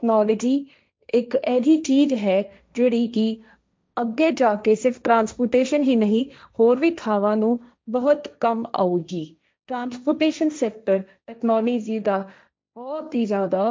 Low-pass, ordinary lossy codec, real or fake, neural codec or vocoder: none; none; fake; codec, 16 kHz, 1.1 kbps, Voila-Tokenizer